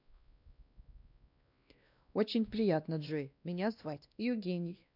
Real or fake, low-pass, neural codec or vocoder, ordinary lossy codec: fake; 5.4 kHz; codec, 16 kHz, 1 kbps, X-Codec, WavLM features, trained on Multilingual LibriSpeech; none